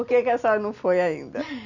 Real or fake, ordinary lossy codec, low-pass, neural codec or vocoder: real; none; 7.2 kHz; none